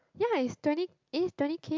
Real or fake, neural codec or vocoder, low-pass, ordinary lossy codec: real; none; 7.2 kHz; MP3, 64 kbps